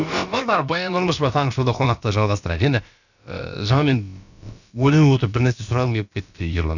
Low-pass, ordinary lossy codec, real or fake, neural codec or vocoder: 7.2 kHz; none; fake; codec, 16 kHz, about 1 kbps, DyCAST, with the encoder's durations